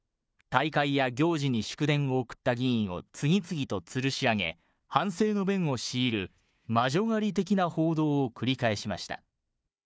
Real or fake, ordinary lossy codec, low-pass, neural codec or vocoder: fake; none; none; codec, 16 kHz, 4 kbps, FunCodec, trained on Chinese and English, 50 frames a second